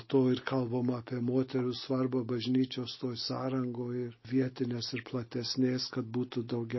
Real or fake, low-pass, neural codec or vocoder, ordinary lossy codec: real; 7.2 kHz; none; MP3, 24 kbps